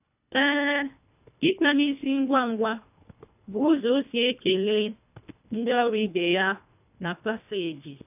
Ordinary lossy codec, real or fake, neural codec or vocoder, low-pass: none; fake; codec, 24 kHz, 1.5 kbps, HILCodec; 3.6 kHz